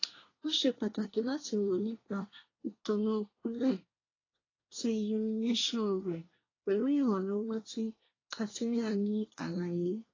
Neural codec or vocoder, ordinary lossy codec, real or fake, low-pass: codec, 24 kHz, 1 kbps, SNAC; AAC, 32 kbps; fake; 7.2 kHz